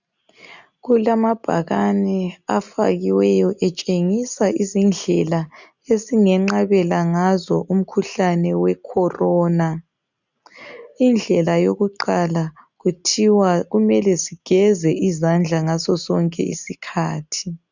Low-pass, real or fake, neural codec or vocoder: 7.2 kHz; real; none